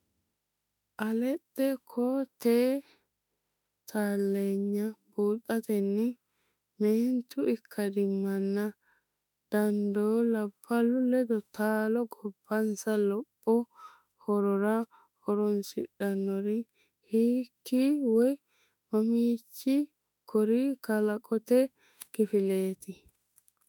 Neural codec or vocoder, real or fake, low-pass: autoencoder, 48 kHz, 32 numbers a frame, DAC-VAE, trained on Japanese speech; fake; 19.8 kHz